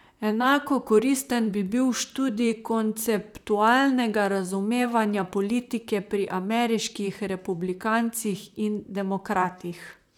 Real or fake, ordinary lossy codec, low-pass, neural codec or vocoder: fake; none; 19.8 kHz; vocoder, 44.1 kHz, 128 mel bands, Pupu-Vocoder